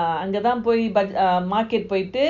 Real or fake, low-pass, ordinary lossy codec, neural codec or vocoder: real; 7.2 kHz; none; none